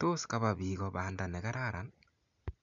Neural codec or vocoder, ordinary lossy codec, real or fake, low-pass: none; none; real; 7.2 kHz